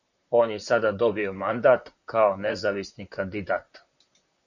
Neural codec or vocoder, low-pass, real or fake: vocoder, 44.1 kHz, 128 mel bands, Pupu-Vocoder; 7.2 kHz; fake